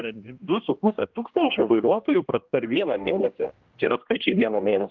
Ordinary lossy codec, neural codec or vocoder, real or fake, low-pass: Opus, 32 kbps; codec, 16 kHz, 1 kbps, X-Codec, HuBERT features, trained on general audio; fake; 7.2 kHz